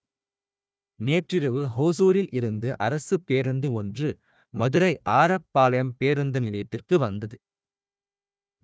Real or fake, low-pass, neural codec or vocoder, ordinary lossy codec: fake; none; codec, 16 kHz, 1 kbps, FunCodec, trained on Chinese and English, 50 frames a second; none